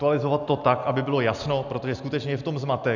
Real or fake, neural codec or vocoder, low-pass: real; none; 7.2 kHz